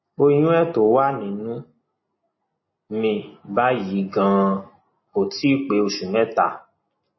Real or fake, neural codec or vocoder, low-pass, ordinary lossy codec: real; none; 7.2 kHz; MP3, 24 kbps